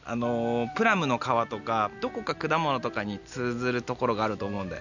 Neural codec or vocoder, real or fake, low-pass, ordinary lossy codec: none; real; 7.2 kHz; none